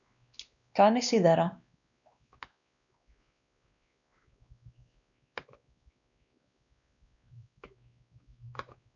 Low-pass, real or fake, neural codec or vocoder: 7.2 kHz; fake; codec, 16 kHz, 2 kbps, X-Codec, WavLM features, trained on Multilingual LibriSpeech